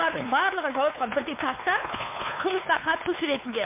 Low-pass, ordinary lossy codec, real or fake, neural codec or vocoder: 3.6 kHz; MP3, 32 kbps; fake; codec, 16 kHz, 4 kbps, X-Codec, WavLM features, trained on Multilingual LibriSpeech